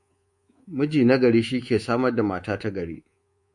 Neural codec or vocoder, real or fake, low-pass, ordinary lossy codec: codec, 24 kHz, 3.1 kbps, DualCodec; fake; 10.8 kHz; MP3, 48 kbps